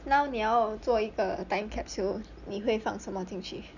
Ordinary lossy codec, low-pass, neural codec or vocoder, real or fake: none; 7.2 kHz; none; real